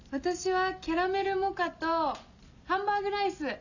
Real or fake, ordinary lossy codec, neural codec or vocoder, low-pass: real; none; none; 7.2 kHz